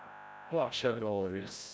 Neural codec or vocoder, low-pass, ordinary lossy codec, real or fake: codec, 16 kHz, 0.5 kbps, FreqCodec, larger model; none; none; fake